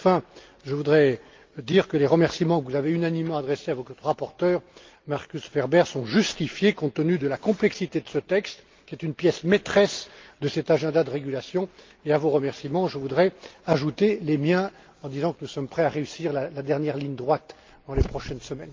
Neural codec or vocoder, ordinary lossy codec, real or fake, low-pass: none; Opus, 32 kbps; real; 7.2 kHz